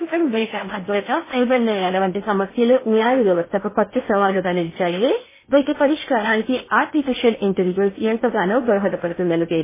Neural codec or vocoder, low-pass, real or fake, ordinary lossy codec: codec, 16 kHz in and 24 kHz out, 0.6 kbps, FocalCodec, streaming, 4096 codes; 3.6 kHz; fake; MP3, 16 kbps